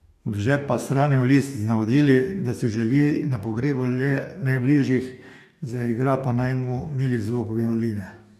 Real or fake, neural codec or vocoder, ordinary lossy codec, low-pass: fake; codec, 44.1 kHz, 2.6 kbps, DAC; none; 14.4 kHz